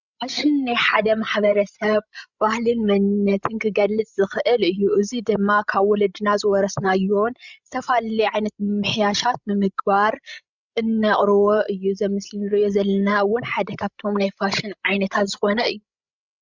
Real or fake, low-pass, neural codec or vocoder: fake; 7.2 kHz; codec, 16 kHz, 16 kbps, FreqCodec, larger model